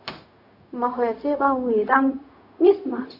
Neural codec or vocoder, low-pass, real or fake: codec, 16 kHz, 0.4 kbps, LongCat-Audio-Codec; 5.4 kHz; fake